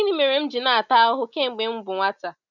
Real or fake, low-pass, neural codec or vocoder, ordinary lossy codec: real; 7.2 kHz; none; none